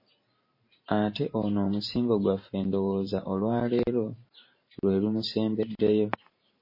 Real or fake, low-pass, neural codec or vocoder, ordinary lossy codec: fake; 5.4 kHz; vocoder, 44.1 kHz, 128 mel bands every 512 samples, BigVGAN v2; MP3, 24 kbps